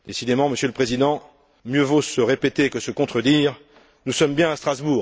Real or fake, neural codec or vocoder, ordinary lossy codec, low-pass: real; none; none; none